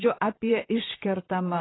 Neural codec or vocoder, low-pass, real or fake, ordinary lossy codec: vocoder, 44.1 kHz, 128 mel bands, Pupu-Vocoder; 7.2 kHz; fake; AAC, 16 kbps